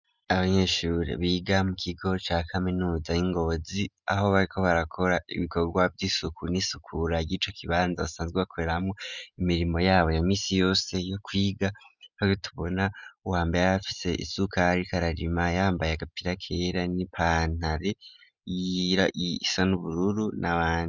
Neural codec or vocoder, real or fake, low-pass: none; real; 7.2 kHz